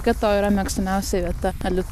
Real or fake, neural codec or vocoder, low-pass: real; none; 14.4 kHz